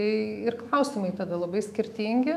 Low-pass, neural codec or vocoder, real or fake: 14.4 kHz; autoencoder, 48 kHz, 128 numbers a frame, DAC-VAE, trained on Japanese speech; fake